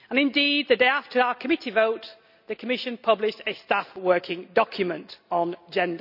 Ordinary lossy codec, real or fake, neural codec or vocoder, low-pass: none; real; none; 5.4 kHz